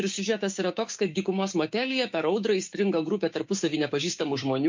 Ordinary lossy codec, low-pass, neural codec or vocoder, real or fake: MP3, 48 kbps; 7.2 kHz; codec, 16 kHz, 6 kbps, DAC; fake